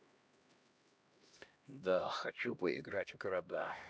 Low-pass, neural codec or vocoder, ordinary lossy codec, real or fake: none; codec, 16 kHz, 1 kbps, X-Codec, HuBERT features, trained on LibriSpeech; none; fake